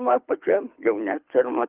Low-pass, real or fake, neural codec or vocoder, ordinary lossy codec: 3.6 kHz; fake; codec, 24 kHz, 6 kbps, HILCodec; Opus, 32 kbps